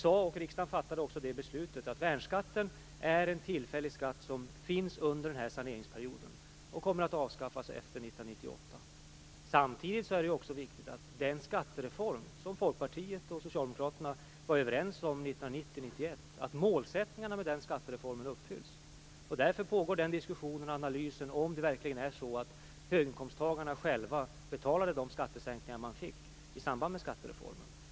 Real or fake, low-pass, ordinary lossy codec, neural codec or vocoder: real; none; none; none